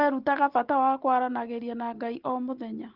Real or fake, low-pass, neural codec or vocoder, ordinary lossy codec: real; 5.4 kHz; none; Opus, 16 kbps